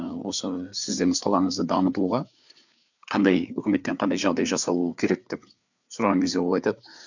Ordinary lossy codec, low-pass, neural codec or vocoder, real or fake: none; 7.2 kHz; codec, 16 kHz, 4 kbps, FreqCodec, larger model; fake